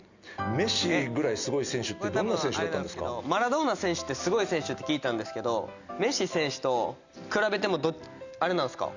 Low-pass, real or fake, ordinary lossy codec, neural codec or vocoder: 7.2 kHz; real; Opus, 64 kbps; none